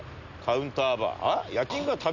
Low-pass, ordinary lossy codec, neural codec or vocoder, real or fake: 7.2 kHz; none; none; real